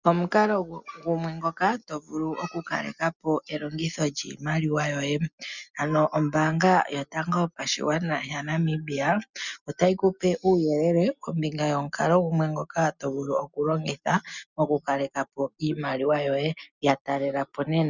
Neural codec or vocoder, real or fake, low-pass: none; real; 7.2 kHz